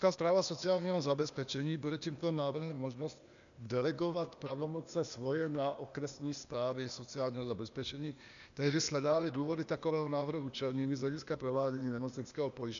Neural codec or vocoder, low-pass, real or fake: codec, 16 kHz, 0.8 kbps, ZipCodec; 7.2 kHz; fake